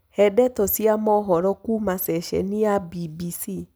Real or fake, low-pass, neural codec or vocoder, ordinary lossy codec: real; none; none; none